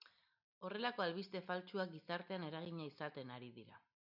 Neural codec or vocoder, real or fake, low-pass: none; real; 5.4 kHz